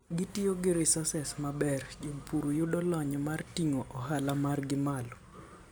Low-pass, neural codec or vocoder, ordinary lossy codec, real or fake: none; none; none; real